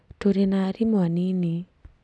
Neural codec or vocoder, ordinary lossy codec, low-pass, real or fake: none; none; none; real